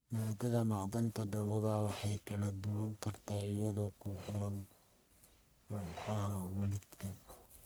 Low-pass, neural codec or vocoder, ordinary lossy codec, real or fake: none; codec, 44.1 kHz, 1.7 kbps, Pupu-Codec; none; fake